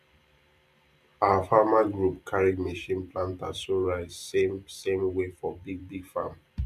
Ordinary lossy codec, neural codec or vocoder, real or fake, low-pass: none; vocoder, 44.1 kHz, 128 mel bands every 512 samples, BigVGAN v2; fake; 14.4 kHz